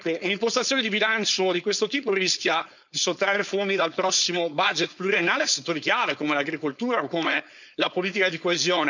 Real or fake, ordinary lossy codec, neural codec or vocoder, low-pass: fake; none; codec, 16 kHz, 4.8 kbps, FACodec; 7.2 kHz